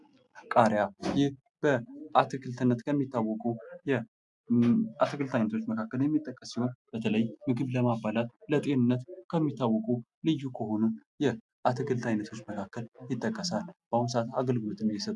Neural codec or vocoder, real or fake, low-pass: none; real; 10.8 kHz